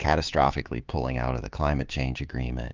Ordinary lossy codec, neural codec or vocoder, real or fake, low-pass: Opus, 32 kbps; codec, 16 kHz, 6 kbps, DAC; fake; 7.2 kHz